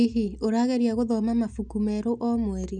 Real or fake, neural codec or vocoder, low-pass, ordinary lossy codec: real; none; 9.9 kHz; none